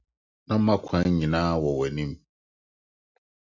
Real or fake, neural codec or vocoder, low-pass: real; none; 7.2 kHz